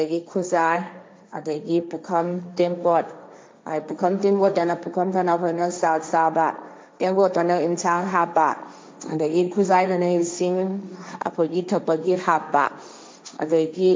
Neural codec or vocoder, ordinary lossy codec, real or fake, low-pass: codec, 16 kHz, 1.1 kbps, Voila-Tokenizer; none; fake; none